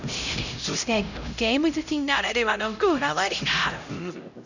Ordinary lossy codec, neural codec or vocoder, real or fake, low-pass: none; codec, 16 kHz, 0.5 kbps, X-Codec, HuBERT features, trained on LibriSpeech; fake; 7.2 kHz